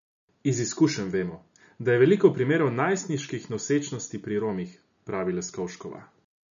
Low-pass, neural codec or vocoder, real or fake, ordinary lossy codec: 7.2 kHz; none; real; none